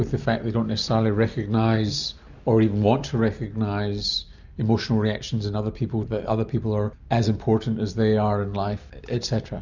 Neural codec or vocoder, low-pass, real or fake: none; 7.2 kHz; real